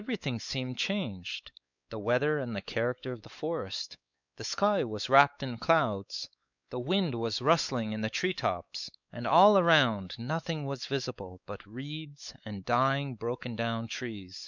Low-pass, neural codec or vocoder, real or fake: 7.2 kHz; codec, 16 kHz, 4 kbps, X-Codec, WavLM features, trained on Multilingual LibriSpeech; fake